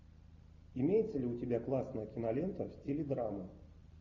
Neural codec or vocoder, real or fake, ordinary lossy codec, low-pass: none; real; Opus, 64 kbps; 7.2 kHz